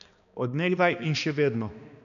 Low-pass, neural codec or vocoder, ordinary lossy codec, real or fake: 7.2 kHz; codec, 16 kHz, 2 kbps, X-Codec, HuBERT features, trained on balanced general audio; none; fake